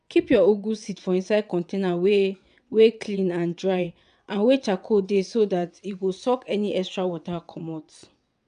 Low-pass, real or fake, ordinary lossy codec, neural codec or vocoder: 9.9 kHz; fake; none; vocoder, 22.05 kHz, 80 mel bands, WaveNeXt